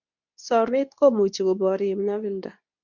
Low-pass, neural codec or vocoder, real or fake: 7.2 kHz; codec, 24 kHz, 0.9 kbps, WavTokenizer, medium speech release version 2; fake